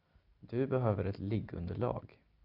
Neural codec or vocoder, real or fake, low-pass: none; real; 5.4 kHz